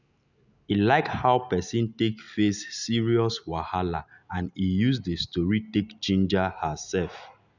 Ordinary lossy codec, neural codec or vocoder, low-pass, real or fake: none; none; 7.2 kHz; real